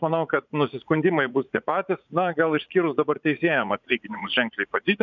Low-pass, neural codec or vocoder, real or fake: 7.2 kHz; vocoder, 44.1 kHz, 80 mel bands, Vocos; fake